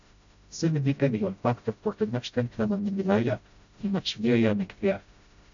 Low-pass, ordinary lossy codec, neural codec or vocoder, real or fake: 7.2 kHz; AAC, 64 kbps; codec, 16 kHz, 0.5 kbps, FreqCodec, smaller model; fake